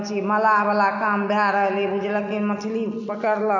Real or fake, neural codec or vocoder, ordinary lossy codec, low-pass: real; none; none; 7.2 kHz